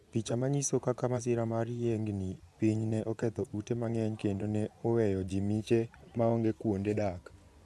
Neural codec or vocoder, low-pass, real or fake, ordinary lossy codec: vocoder, 24 kHz, 100 mel bands, Vocos; none; fake; none